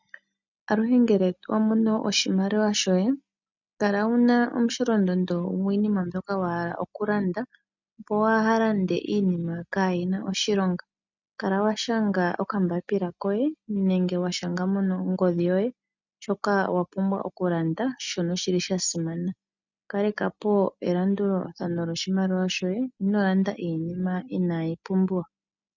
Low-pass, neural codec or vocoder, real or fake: 7.2 kHz; none; real